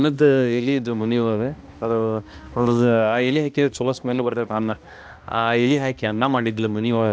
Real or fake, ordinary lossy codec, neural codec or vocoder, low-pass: fake; none; codec, 16 kHz, 1 kbps, X-Codec, HuBERT features, trained on balanced general audio; none